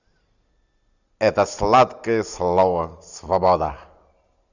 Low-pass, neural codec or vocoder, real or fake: 7.2 kHz; none; real